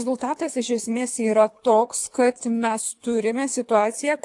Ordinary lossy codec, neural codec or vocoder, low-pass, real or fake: AAC, 64 kbps; codec, 24 kHz, 3 kbps, HILCodec; 10.8 kHz; fake